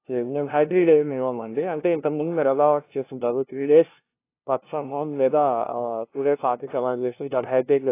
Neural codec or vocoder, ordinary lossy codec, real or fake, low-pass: codec, 16 kHz, 0.5 kbps, FunCodec, trained on LibriTTS, 25 frames a second; AAC, 24 kbps; fake; 3.6 kHz